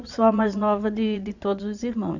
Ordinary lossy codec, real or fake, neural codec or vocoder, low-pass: none; fake; vocoder, 22.05 kHz, 80 mel bands, Vocos; 7.2 kHz